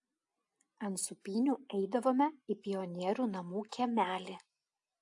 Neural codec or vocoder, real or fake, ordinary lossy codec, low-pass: none; real; AAC, 64 kbps; 10.8 kHz